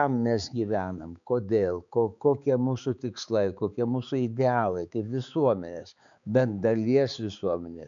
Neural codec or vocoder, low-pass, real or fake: codec, 16 kHz, 4 kbps, X-Codec, HuBERT features, trained on balanced general audio; 7.2 kHz; fake